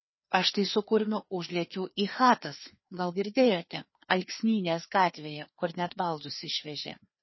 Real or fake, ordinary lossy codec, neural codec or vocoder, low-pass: fake; MP3, 24 kbps; codec, 16 kHz, 2 kbps, FreqCodec, larger model; 7.2 kHz